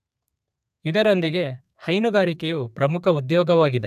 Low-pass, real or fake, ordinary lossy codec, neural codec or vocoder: 14.4 kHz; fake; none; codec, 32 kHz, 1.9 kbps, SNAC